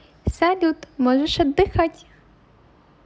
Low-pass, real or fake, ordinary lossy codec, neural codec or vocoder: none; real; none; none